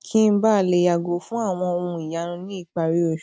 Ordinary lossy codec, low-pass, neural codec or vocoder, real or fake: none; none; none; real